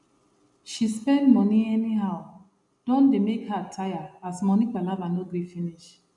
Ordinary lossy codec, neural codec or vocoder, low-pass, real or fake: none; none; 10.8 kHz; real